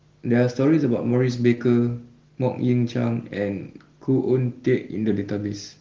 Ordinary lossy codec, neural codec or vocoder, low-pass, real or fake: Opus, 16 kbps; none; 7.2 kHz; real